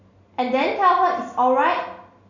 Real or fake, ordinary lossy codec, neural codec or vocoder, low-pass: real; none; none; 7.2 kHz